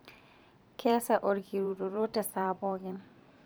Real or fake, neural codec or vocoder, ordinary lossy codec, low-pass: fake; vocoder, 44.1 kHz, 128 mel bands every 512 samples, BigVGAN v2; none; none